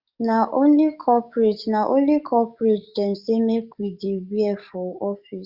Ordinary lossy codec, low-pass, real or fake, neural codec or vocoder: Opus, 64 kbps; 5.4 kHz; fake; codec, 44.1 kHz, 7.8 kbps, DAC